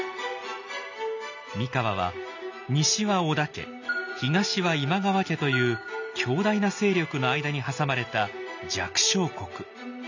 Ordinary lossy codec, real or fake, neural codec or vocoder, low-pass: none; real; none; 7.2 kHz